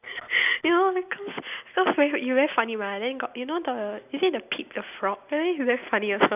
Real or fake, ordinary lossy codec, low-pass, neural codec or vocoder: real; none; 3.6 kHz; none